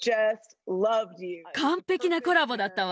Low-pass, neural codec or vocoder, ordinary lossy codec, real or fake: none; none; none; real